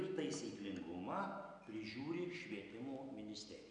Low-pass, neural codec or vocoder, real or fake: 9.9 kHz; none; real